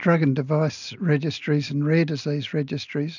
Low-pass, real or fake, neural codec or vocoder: 7.2 kHz; real; none